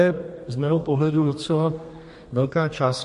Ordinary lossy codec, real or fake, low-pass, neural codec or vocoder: MP3, 48 kbps; fake; 14.4 kHz; codec, 32 kHz, 1.9 kbps, SNAC